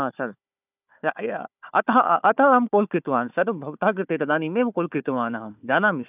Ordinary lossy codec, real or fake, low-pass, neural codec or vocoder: none; fake; 3.6 kHz; codec, 16 kHz, 4 kbps, FunCodec, trained on Chinese and English, 50 frames a second